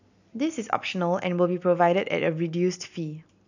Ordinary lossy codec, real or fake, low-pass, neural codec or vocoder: none; real; 7.2 kHz; none